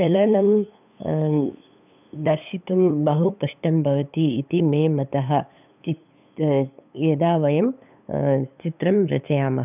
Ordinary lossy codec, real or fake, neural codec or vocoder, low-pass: none; fake; codec, 16 kHz, 8 kbps, FunCodec, trained on LibriTTS, 25 frames a second; 3.6 kHz